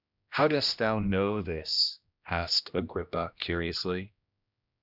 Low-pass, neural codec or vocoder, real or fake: 5.4 kHz; codec, 16 kHz, 1 kbps, X-Codec, HuBERT features, trained on general audio; fake